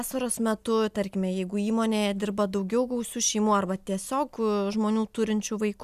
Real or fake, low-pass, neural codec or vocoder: real; 14.4 kHz; none